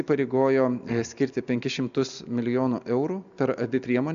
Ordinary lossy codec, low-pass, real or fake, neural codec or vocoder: AAC, 96 kbps; 7.2 kHz; real; none